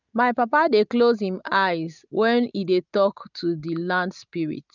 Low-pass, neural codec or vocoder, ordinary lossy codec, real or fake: 7.2 kHz; none; none; real